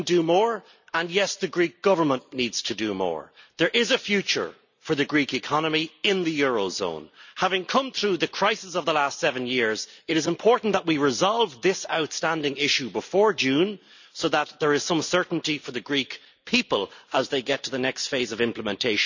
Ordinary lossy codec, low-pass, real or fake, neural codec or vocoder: none; 7.2 kHz; real; none